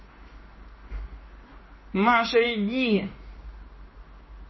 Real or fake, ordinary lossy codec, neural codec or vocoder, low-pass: fake; MP3, 24 kbps; codec, 16 kHz in and 24 kHz out, 1 kbps, XY-Tokenizer; 7.2 kHz